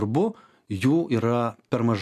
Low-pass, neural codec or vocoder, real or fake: 14.4 kHz; none; real